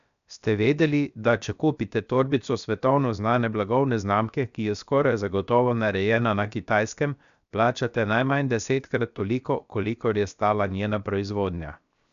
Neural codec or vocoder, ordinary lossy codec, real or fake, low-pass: codec, 16 kHz, 0.7 kbps, FocalCodec; none; fake; 7.2 kHz